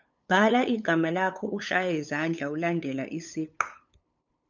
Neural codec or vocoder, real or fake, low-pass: codec, 16 kHz, 8 kbps, FunCodec, trained on LibriTTS, 25 frames a second; fake; 7.2 kHz